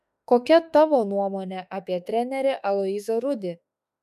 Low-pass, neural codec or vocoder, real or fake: 14.4 kHz; autoencoder, 48 kHz, 32 numbers a frame, DAC-VAE, trained on Japanese speech; fake